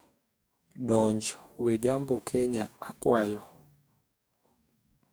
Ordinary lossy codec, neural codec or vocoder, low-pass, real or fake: none; codec, 44.1 kHz, 2.6 kbps, DAC; none; fake